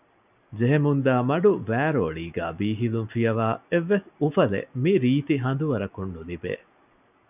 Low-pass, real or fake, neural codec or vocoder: 3.6 kHz; real; none